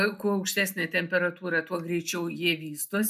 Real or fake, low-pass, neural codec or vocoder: real; 14.4 kHz; none